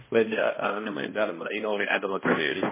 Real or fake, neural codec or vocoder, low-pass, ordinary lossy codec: fake; codec, 16 kHz, 1 kbps, X-Codec, HuBERT features, trained on balanced general audio; 3.6 kHz; MP3, 16 kbps